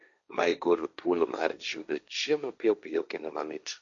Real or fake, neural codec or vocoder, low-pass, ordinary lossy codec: fake; codec, 16 kHz, 1.1 kbps, Voila-Tokenizer; 7.2 kHz; none